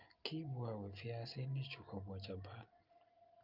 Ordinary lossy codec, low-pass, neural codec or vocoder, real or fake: Opus, 32 kbps; 5.4 kHz; none; real